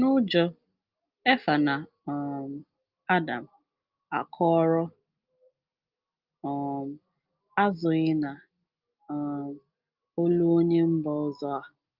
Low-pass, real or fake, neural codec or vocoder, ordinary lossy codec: 5.4 kHz; real; none; Opus, 32 kbps